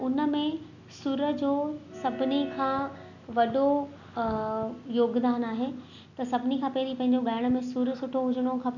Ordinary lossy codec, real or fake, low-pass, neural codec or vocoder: none; real; 7.2 kHz; none